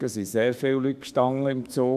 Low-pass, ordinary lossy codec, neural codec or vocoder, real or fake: 14.4 kHz; none; autoencoder, 48 kHz, 32 numbers a frame, DAC-VAE, trained on Japanese speech; fake